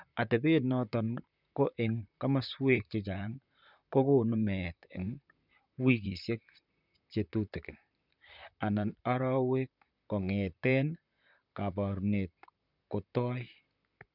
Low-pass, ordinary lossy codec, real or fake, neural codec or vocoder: 5.4 kHz; none; fake; vocoder, 44.1 kHz, 128 mel bands, Pupu-Vocoder